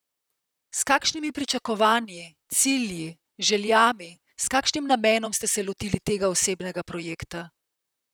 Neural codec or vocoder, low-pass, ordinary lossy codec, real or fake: vocoder, 44.1 kHz, 128 mel bands, Pupu-Vocoder; none; none; fake